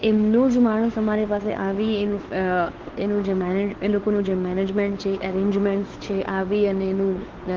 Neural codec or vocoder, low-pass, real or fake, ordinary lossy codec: codec, 16 kHz, 2 kbps, FunCodec, trained on Chinese and English, 25 frames a second; 7.2 kHz; fake; Opus, 16 kbps